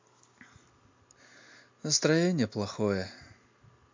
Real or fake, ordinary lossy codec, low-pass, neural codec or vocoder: real; MP3, 48 kbps; 7.2 kHz; none